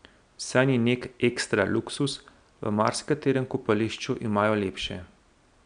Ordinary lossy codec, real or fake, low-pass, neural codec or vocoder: none; real; 9.9 kHz; none